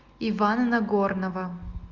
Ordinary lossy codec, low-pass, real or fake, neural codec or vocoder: Opus, 32 kbps; 7.2 kHz; real; none